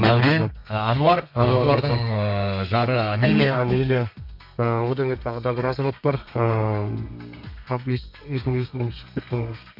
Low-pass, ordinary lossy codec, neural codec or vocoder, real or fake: 5.4 kHz; MP3, 48 kbps; codec, 32 kHz, 1.9 kbps, SNAC; fake